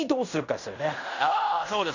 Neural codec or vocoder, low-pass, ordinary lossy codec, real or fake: codec, 16 kHz in and 24 kHz out, 0.9 kbps, LongCat-Audio-Codec, fine tuned four codebook decoder; 7.2 kHz; none; fake